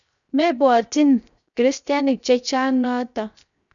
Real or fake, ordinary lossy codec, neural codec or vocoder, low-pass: fake; none; codec, 16 kHz, 0.7 kbps, FocalCodec; 7.2 kHz